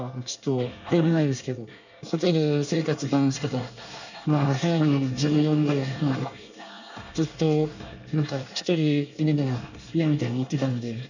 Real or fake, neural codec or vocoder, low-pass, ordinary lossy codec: fake; codec, 24 kHz, 1 kbps, SNAC; 7.2 kHz; none